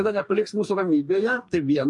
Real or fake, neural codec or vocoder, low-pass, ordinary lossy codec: fake; codec, 44.1 kHz, 2.6 kbps, DAC; 10.8 kHz; MP3, 64 kbps